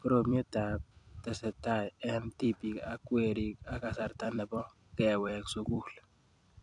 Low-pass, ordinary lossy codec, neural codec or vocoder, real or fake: 10.8 kHz; none; vocoder, 44.1 kHz, 128 mel bands every 256 samples, BigVGAN v2; fake